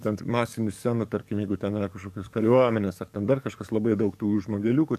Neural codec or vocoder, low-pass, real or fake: codec, 44.1 kHz, 7.8 kbps, DAC; 14.4 kHz; fake